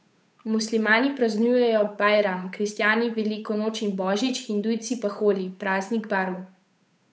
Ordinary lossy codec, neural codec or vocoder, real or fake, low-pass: none; codec, 16 kHz, 8 kbps, FunCodec, trained on Chinese and English, 25 frames a second; fake; none